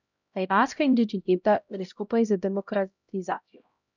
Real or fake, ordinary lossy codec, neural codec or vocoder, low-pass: fake; none; codec, 16 kHz, 0.5 kbps, X-Codec, HuBERT features, trained on LibriSpeech; 7.2 kHz